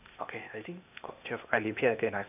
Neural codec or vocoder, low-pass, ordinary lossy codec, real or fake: codec, 16 kHz, 0.8 kbps, ZipCodec; 3.6 kHz; none; fake